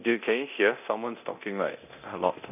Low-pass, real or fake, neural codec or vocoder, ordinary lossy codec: 3.6 kHz; fake; codec, 24 kHz, 0.9 kbps, DualCodec; none